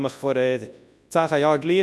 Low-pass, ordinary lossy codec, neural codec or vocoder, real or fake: none; none; codec, 24 kHz, 0.9 kbps, WavTokenizer, large speech release; fake